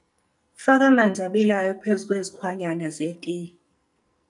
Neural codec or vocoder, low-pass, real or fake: codec, 44.1 kHz, 2.6 kbps, SNAC; 10.8 kHz; fake